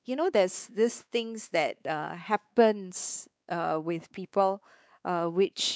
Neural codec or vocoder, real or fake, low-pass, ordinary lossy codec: codec, 16 kHz, 4 kbps, X-Codec, WavLM features, trained on Multilingual LibriSpeech; fake; none; none